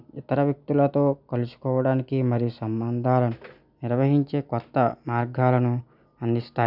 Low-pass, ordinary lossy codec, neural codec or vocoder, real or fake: 5.4 kHz; none; none; real